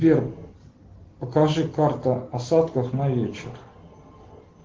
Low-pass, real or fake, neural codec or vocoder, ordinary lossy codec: 7.2 kHz; real; none; Opus, 16 kbps